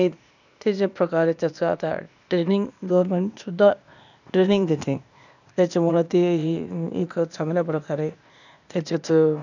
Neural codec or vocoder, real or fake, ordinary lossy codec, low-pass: codec, 16 kHz, 0.8 kbps, ZipCodec; fake; none; 7.2 kHz